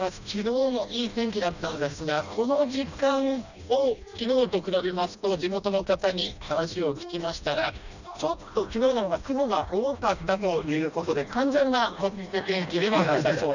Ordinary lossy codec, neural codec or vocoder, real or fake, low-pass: none; codec, 16 kHz, 1 kbps, FreqCodec, smaller model; fake; 7.2 kHz